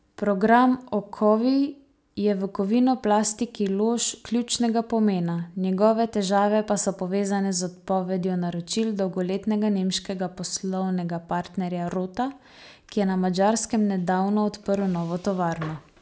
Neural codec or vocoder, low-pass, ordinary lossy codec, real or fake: none; none; none; real